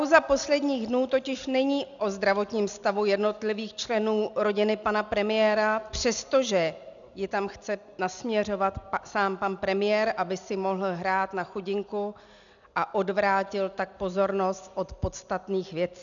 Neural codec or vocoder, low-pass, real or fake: none; 7.2 kHz; real